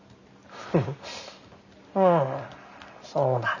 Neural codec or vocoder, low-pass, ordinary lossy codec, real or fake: none; 7.2 kHz; none; real